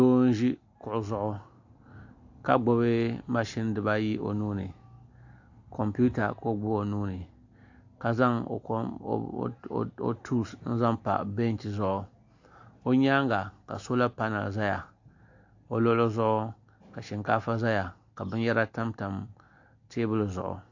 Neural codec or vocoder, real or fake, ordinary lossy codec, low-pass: none; real; AAC, 48 kbps; 7.2 kHz